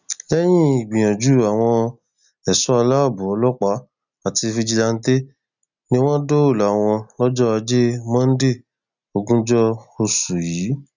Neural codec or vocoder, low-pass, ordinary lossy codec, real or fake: none; 7.2 kHz; none; real